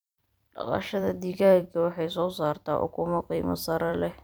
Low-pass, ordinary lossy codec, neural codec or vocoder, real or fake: none; none; vocoder, 44.1 kHz, 128 mel bands every 256 samples, BigVGAN v2; fake